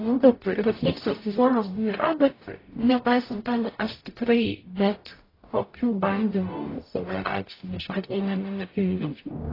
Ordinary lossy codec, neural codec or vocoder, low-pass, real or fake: AAC, 24 kbps; codec, 44.1 kHz, 0.9 kbps, DAC; 5.4 kHz; fake